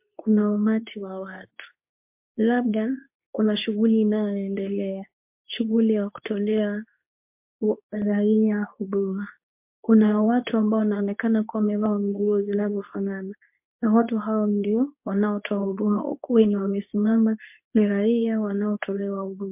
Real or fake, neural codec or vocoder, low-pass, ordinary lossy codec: fake; codec, 24 kHz, 0.9 kbps, WavTokenizer, medium speech release version 2; 3.6 kHz; MP3, 32 kbps